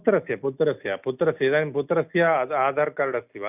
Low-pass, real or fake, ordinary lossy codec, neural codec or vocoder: 3.6 kHz; real; none; none